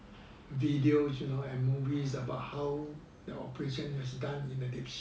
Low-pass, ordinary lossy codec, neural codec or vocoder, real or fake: none; none; none; real